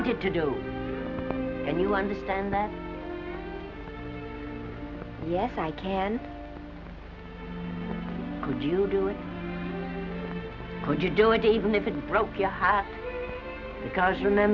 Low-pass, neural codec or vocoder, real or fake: 7.2 kHz; none; real